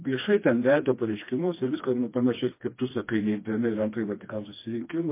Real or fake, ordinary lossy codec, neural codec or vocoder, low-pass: fake; MP3, 24 kbps; codec, 16 kHz, 2 kbps, FreqCodec, smaller model; 3.6 kHz